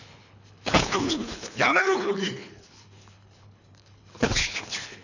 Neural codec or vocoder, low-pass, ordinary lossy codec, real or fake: codec, 24 kHz, 3 kbps, HILCodec; 7.2 kHz; none; fake